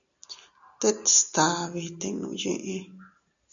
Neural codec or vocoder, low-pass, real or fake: none; 7.2 kHz; real